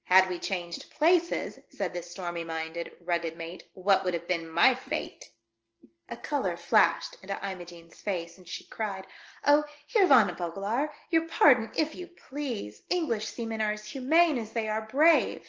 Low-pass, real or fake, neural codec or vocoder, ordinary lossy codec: 7.2 kHz; real; none; Opus, 16 kbps